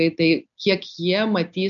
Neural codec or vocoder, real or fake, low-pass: none; real; 7.2 kHz